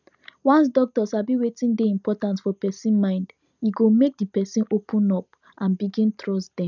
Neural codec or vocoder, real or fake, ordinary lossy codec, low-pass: none; real; none; 7.2 kHz